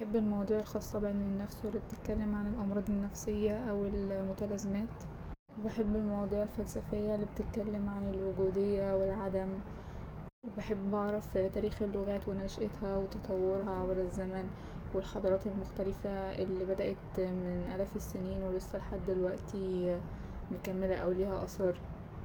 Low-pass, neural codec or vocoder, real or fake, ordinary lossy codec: none; codec, 44.1 kHz, 7.8 kbps, DAC; fake; none